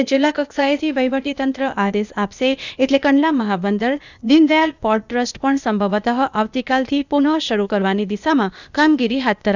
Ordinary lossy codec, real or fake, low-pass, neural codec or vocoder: none; fake; 7.2 kHz; codec, 16 kHz, 0.8 kbps, ZipCodec